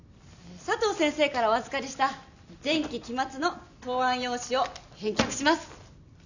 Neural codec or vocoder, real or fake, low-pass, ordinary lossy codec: none; real; 7.2 kHz; none